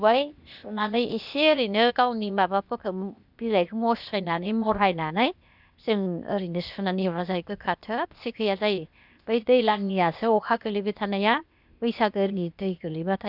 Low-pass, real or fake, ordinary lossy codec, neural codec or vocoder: 5.4 kHz; fake; none; codec, 16 kHz, 0.8 kbps, ZipCodec